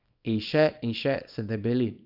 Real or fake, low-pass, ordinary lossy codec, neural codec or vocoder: fake; 5.4 kHz; none; codec, 24 kHz, 0.9 kbps, WavTokenizer, small release